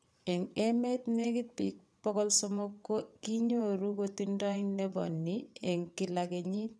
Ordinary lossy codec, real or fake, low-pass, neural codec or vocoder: none; fake; none; vocoder, 22.05 kHz, 80 mel bands, WaveNeXt